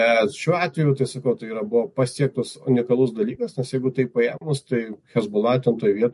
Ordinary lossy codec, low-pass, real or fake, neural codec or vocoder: MP3, 48 kbps; 14.4 kHz; real; none